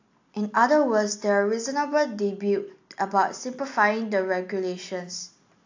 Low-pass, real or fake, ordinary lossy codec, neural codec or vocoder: 7.2 kHz; real; AAC, 48 kbps; none